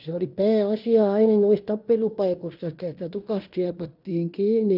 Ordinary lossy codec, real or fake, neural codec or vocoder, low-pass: none; fake; codec, 16 kHz in and 24 kHz out, 0.9 kbps, LongCat-Audio-Codec, fine tuned four codebook decoder; 5.4 kHz